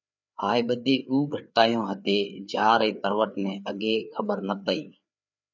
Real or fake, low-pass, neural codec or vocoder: fake; 7.2 kHz; codec, 16 kHz, 4 kbps, FreqCodec, larger model